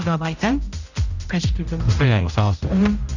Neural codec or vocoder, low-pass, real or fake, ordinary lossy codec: codec, 16 kHz, 0.5 kbps, X-Codec, HuBERT features, trained on general audio; 7.2 kHz; fake; none